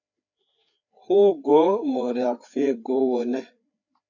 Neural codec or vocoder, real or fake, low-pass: codec, 16 kHz, 4 kbps, FreqCodec, larger model; fake; 7.2 kHz